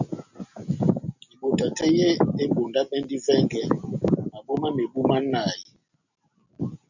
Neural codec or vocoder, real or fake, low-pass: none; real; 7.2 kHz